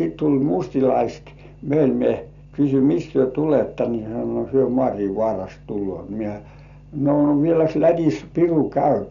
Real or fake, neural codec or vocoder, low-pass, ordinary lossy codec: real; none; 7.2 kHz; none